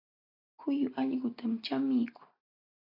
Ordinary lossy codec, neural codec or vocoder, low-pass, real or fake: AAC, 24 kbps; none; 5.4 kHz; real